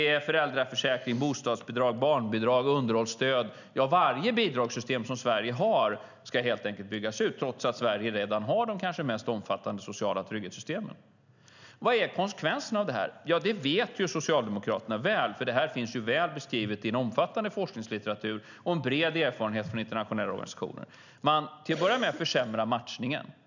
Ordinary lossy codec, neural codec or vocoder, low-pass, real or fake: none; none; 7.2 kHz; real